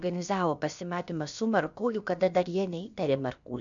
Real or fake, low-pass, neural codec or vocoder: fake; 7.2 kHz; codec, 16 kHz, about 1 kbps, DyCAST, with the encoder's durations